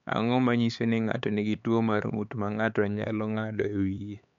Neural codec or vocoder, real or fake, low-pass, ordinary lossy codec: codec, 16 kHz, 4 kbps, X-Codec, HuBERT features, trained on LibriSpeech; fake; 7.2 kHz; MP3, 64 kbps